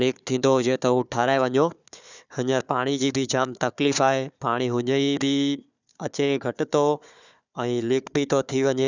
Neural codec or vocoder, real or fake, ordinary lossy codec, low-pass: codec, 16 kHz, 4 kbps, FunCodec, trained on Chinese and English, 50 frames a second; fake; none; 7.2 kHz